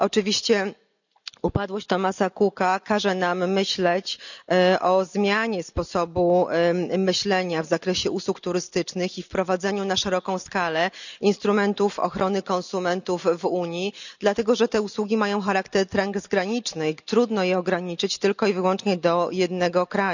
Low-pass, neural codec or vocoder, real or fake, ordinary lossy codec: 7.2 kHz; none; real; none